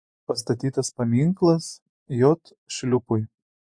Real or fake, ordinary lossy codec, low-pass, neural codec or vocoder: fake; MP3, 48 kbps; 9.9 kHz; vocoder, 24 kHz, 100 mel bands, Vocos